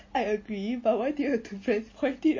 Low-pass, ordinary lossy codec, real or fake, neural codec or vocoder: 7.2 kHz; MP3, 32 kbps; real; none